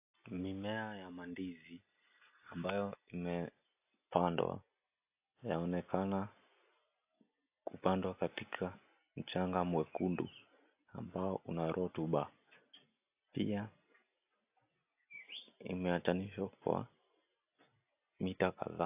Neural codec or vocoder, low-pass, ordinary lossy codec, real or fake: none; 3.6 kHz; AAC, 32 kbps; real